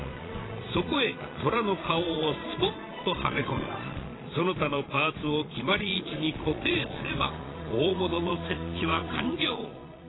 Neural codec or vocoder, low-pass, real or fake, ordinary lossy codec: vocoder, 22.05 kHz, 80 mel bands, Vocos; 7.2 kHz; fake; AAC, 16 kbps